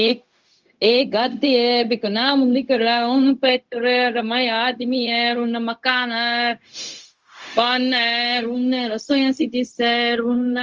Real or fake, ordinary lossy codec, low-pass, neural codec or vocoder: fake; Opus, 32 kbps; 7.2 kHz; codec, 16 kHz, 0.4 kbps, LongCat-Audio-Codec